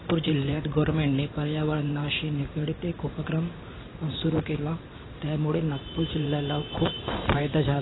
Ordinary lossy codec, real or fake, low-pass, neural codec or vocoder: AAC, 16 kbps; fake; 7.2 kHz; vocoder, 44.1 kHz, 128 mel bands every 512 samples, BigVGAN v2